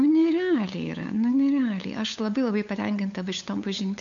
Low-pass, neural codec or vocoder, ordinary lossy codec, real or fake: 7.2 kHz; codec, 16 kHz, 8 kbps, FunCodec, trained on LibriTTS, 25 frames a second; MP3, 64 kbps; fake